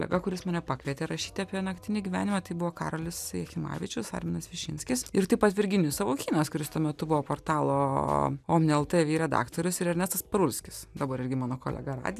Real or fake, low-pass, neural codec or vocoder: real; 14.4 kHz; none